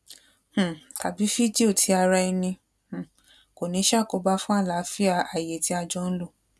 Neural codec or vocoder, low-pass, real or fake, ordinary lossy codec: none; none; real; none